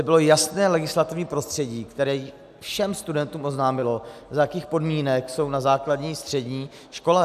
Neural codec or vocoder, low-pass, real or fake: none; 14.4 kHz; real